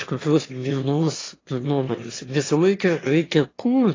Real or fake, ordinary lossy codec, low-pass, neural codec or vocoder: fake; AAC, 32 kbps; 7.2 kHz; autoencoder, 22.05 kHz, a latent of 192 numbers a frame, VITS, trained on one speaker